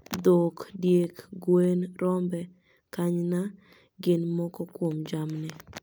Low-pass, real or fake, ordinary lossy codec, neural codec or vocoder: none; real; none; none